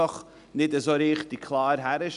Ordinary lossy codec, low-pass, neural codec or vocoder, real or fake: none; 10.8 kHz; none; real